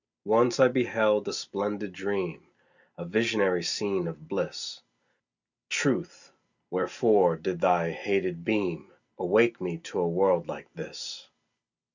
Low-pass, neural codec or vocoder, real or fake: 7.2 kHz; none; real